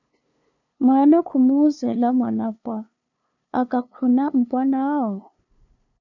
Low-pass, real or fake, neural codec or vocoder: 7.2 kHz; fake; codec, 16 kHz, 2 kbps, FunCodec, trained on LibriTTS, 25 frames a second